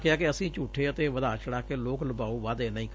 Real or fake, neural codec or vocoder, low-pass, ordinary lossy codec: real; none; none; none